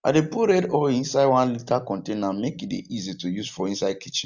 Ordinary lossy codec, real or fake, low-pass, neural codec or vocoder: none; real; 7.2 kHz; none